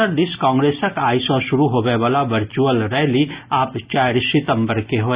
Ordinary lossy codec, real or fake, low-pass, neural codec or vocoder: Opus, 64 kbps; real; 3.6 kHz; none